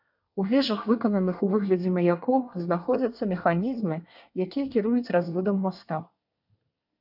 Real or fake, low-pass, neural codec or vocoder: fake; 5.4 kHz; codec, 24 kHz, 1 kbps, SNAC